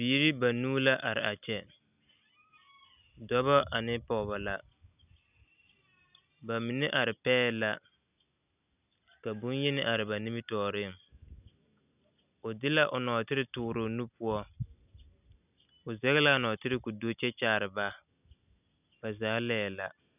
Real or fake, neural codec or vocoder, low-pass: real; none; 3.6 kHz